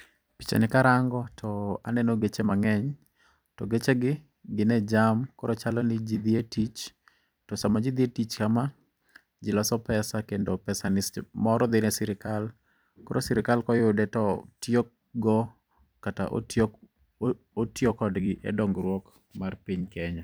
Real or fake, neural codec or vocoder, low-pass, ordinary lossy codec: fake; vocoder, 44.1 kHz, 128 mel bands every 256 samples, BigVGAN v2; none; none